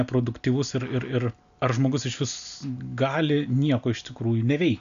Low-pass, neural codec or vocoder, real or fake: 7.2 kHz; none; real